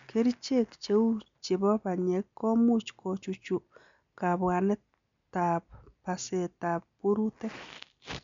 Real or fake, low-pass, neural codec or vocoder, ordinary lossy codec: real; 7.2 kHz; none; MP3, 64 kbps